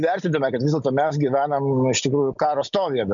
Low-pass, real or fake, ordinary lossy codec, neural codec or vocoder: 7.2 kHz; real; MP3, 96 kbps; none